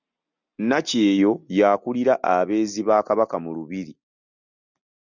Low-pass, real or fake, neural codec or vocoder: 7.2 kHz; real; none